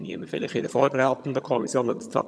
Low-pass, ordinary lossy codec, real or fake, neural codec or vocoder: none; none; fake; vocoder, 22.05 kHz, 80 mel bands, HiFi-GAN